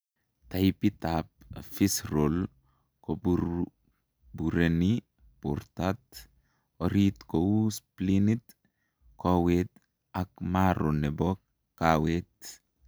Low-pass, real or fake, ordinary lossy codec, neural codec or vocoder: none; real; none; none